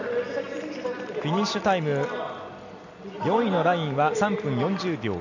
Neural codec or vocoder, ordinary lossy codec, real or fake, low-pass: none; none; real; 7.2 kHz